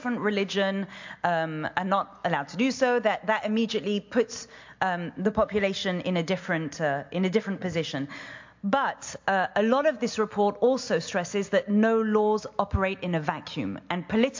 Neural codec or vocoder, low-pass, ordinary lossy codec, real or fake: none; 7.2 kHz; MP3, 48 kbps; real